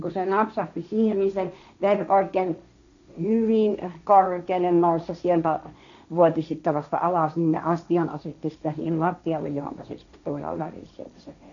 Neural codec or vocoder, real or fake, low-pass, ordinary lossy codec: codec, 16 kHz, 1.1 kbps, Voila-Tokenizer; fake; 7.2 kHz; none